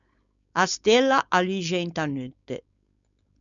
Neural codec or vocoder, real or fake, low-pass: codec, 16 kHz, 4.8 kbps, FACodec; fake; 7.2 kHz